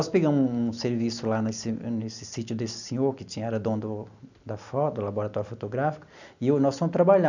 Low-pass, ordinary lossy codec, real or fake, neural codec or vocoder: 7.2 kHz; none; real; none